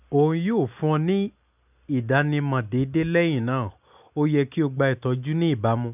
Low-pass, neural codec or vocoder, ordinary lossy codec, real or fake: 3.6 kHz; none; none; real